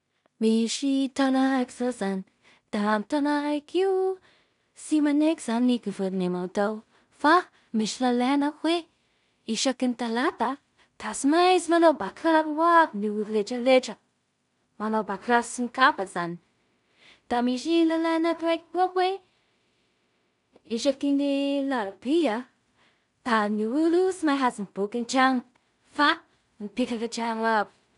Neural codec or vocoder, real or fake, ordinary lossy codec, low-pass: codec, 16 kHz in and 24 kHz out, 0.4 kbps, LongCat-Audio-Codec, two codebook decoder; fake; none; 10.8 kHz